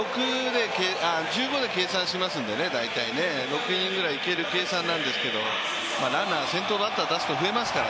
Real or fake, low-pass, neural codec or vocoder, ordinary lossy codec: real; none; none; none